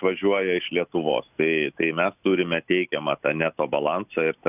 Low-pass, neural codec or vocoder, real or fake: 3.6 kHz; none; real